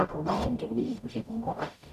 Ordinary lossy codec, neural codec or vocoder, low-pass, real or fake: none; codec, 44.1 kHz, 0.9 kbps, DAC; 14.4 kHz; fake